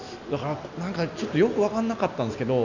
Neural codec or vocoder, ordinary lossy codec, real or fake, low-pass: none; none; real; 7.2 kHz